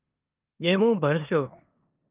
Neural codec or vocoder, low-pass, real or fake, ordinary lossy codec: codec, 16 kHz in and 24 kHz out, 0.9 kbps, LongCat-Audio-Codec, four codebook decoder; 3.6 kHz; fake; Opus, 32 kbps